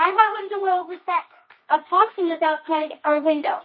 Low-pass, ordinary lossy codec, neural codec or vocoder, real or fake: 7.2 kHz; MP3, 24 kbps; codec, 24 kHz, 0.9 kbps, WavTokenizer, medium music audio release; fake